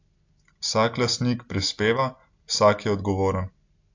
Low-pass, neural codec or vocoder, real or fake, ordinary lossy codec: 7.2 kHz; none; real; AAC, 48 kbps